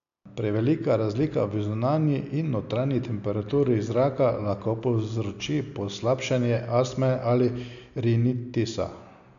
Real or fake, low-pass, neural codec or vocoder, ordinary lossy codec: real; 7.2 kHz; none; none